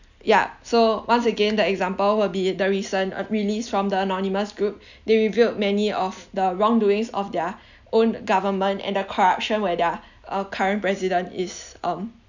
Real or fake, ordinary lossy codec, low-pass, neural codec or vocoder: real; none; 7.2 kHz; none